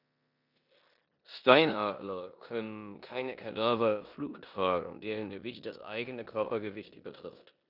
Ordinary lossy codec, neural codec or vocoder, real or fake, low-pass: none; codec, 16 kHz in and 24 kHz out, 0.9 kbps, LongCat-Audio-Codec, four codebook decoder; fake; 5.4 kHz